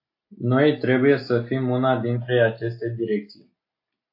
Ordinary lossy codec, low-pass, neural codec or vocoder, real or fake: AAC, 32 kbps; 5.4 kHz; none; real